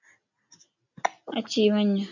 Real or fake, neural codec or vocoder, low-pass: real; none; 7.2 kHz